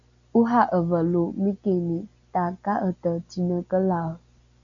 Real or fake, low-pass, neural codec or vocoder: real; 7.2 kHz; none